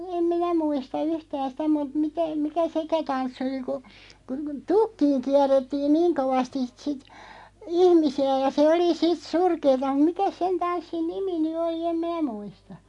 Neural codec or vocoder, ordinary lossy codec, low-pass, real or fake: none; AAC, 64 kbps; 10.8 kHz; real